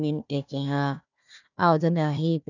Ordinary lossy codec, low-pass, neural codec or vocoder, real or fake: none; 7.2 kHz; codec, 16 kHz, 1 kbps, FunCodec, trained on Chinese and English, 50 frames a second; fake